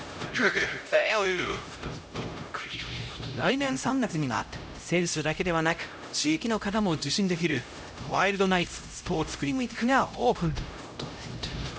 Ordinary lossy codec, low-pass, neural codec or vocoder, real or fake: none; none; codec, 16 kHz, 0.5 kbps, X-Codec, HuBERT features, trained on LibriSpeech; fake